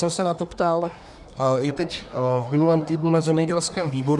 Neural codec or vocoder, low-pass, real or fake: codec, 24 kHz, 1 kbps, SNAC; 10.8 kHz; fake